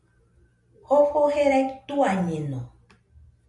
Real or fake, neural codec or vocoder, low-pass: real; none; 10.8 kHz